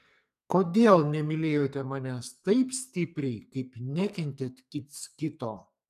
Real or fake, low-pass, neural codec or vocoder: fake; 14.4 kHz; codec, 44.1 kHz, 2.6 kbps, SNAC